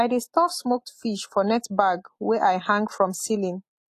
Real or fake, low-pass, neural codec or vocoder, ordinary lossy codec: real; 14.4 kHz; none; AAC, 48 kbps